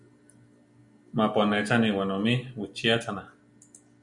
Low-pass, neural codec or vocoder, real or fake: 10.8 kHz; none; real